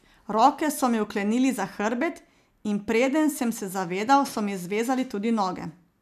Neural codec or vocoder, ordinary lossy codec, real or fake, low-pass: none; none; real; 14.4 kHz